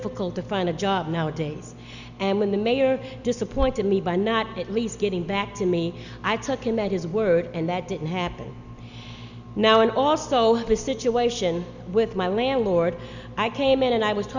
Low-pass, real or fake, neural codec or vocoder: 7.2 kHz; real; none